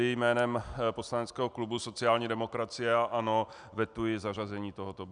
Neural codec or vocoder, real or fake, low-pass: none; real; 9.9 kHz